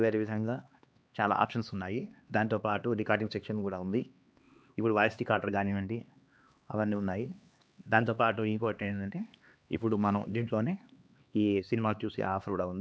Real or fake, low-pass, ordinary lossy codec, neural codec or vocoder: fake; none; none; codec, 16 kHz, 2 kbps, X-Codec, HuBERT features, trained on LibriSpeech